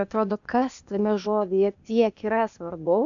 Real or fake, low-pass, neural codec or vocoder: fake; 7.2 kHz; codec, 16 kHz, 0.8 kbps, ZipCodec